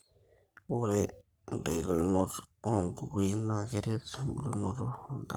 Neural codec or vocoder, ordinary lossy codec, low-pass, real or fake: codec, 44.1 kHz, 3.4 kbps, Pupu-Codec; none; none; fake